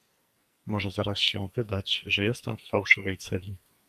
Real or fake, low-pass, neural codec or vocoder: fake; 14.4 kHz; codec, 44.1 kHz, 2.6 kbps, SNAC